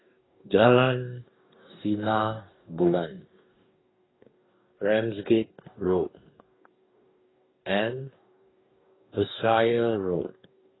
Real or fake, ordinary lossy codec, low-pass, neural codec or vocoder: fake; AAC, 16 kbps; 7.2 kHz; codec, 44.1 kHz, 2.6 kbps, DAC